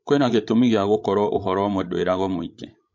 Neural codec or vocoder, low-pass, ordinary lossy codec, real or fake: codec, 16 kHz, 16 kbps, FreqCodec, larger model; 7.2 kHz; MP3, 48 kbps; fake